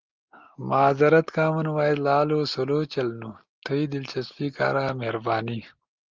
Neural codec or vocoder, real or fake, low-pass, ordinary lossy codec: none; real; 7.2 kHz; Opus, 32 kbps